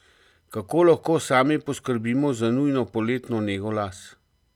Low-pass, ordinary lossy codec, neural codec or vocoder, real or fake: 19.8 kHz; none; none; real